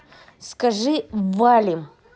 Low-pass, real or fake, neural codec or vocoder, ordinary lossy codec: none; real; none; none